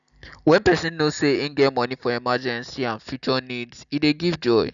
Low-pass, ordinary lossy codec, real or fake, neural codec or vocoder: 7.2 kHz; none; real; none